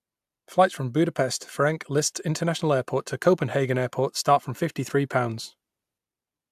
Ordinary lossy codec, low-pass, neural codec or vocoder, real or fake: AAC, 96 kbps; 14.4 kHz; none; real